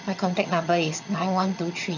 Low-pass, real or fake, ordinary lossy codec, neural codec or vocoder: 7.2 kHz; fake; none; vocoder, 22.05 kHz, 80 mel bands, HiFi-GAN